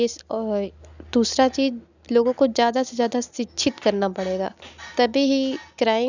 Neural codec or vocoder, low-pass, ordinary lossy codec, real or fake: none; 7.2 kHz; none; real